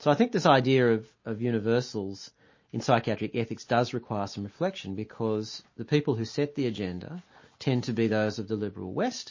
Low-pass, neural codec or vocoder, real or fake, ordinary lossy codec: 7.2 kHz; none; real; MP3, 32 kbps